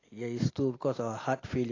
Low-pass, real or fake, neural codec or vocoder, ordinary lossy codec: 7.2 kHz; real; none; AAC, 32 kbps